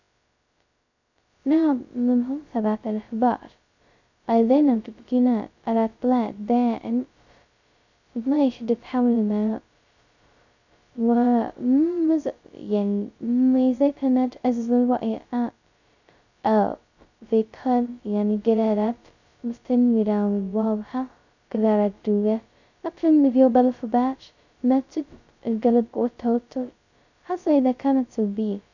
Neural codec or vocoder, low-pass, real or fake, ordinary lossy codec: codec, 16 kHz, 0.2 kbps, FocalCodec; 7.2 kHz; fake; none